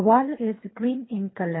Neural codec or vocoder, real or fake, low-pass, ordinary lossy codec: codec, 24 kHz, 3 kbps, HILCodec; fake; 7.2 kHz; AAC, 16 kbps